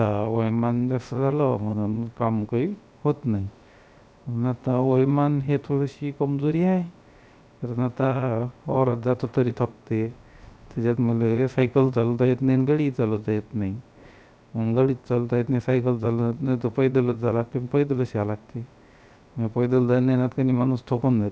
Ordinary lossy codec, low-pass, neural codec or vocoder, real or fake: none; none; codec, 16 kHz, 0.7 kbps, FocalCodec; fake